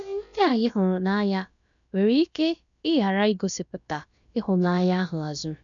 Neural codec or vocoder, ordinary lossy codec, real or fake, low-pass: codec, 16 kHz, about 1 kbps, DyCAST, with the encoder's durations; none; fake; 7.2 kHz